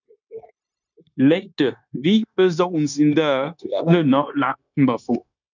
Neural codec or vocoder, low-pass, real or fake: codec, 16 kHz, 0.9 kbps, LongCat-Audio-Codec; 7.2 kHz; fake